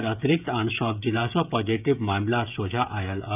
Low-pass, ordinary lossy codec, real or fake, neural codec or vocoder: 3.6 kHz; none; fake; codec, 16 kHz, 16 kbps, FreqCodec, smaller model